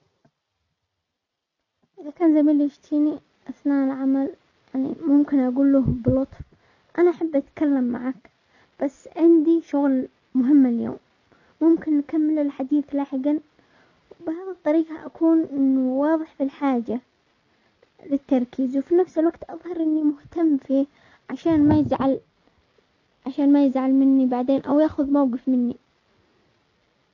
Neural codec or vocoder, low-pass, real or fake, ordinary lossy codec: none; 7.2 kHz; real; none